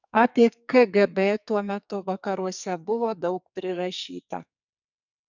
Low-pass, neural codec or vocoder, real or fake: 7.2 kHz; codec, 44.1 kHz, 2.6 kbps, SNAC; fake